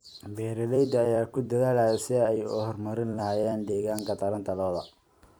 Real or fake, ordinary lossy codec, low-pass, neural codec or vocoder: fake; none; none; vocoder, 44.1 kHz, 128 mel bands every 256 samples, BigVGAN v2